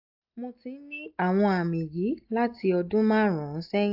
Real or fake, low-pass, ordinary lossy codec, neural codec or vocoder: real; 5.4 kHz; AAC, 48 kbps; none